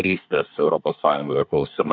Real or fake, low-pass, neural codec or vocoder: fake; 7.2 kHz; codec, 24 kHz, 1 kbps, SNAC